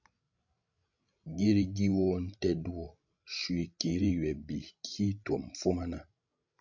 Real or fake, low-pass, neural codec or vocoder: fake; 7.2 kHz; codec, 16 kHz, 16 kbps, FreqCodec, larger model